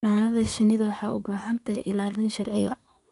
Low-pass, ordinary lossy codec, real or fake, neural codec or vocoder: 10.8 kHz; none; fake; codec, 24 kHz, 1 kbps, SNAC